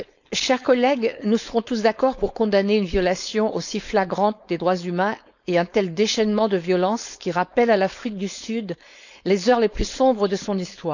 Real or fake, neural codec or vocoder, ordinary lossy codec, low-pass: fake; codec, 16 kHz, 4.8 kbps, FACodec; none; 7.2 kHz